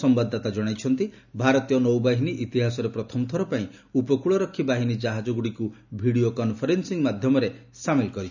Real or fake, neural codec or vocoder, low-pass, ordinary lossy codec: real; none; 7.2 kHz; none